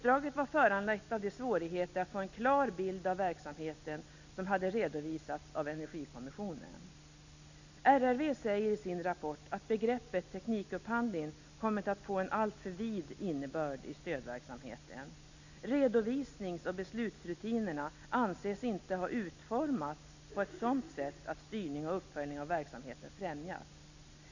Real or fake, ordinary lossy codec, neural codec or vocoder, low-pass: real; none; none; 7.2 kHz